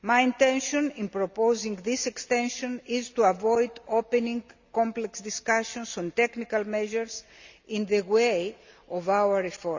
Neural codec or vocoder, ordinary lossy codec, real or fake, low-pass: none; Opus, 64 kbps; real; 7.2 kHz